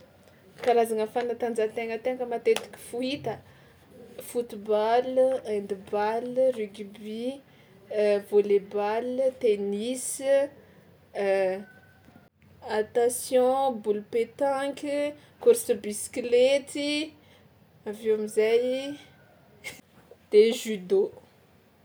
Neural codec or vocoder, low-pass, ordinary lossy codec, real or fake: none; none; none; real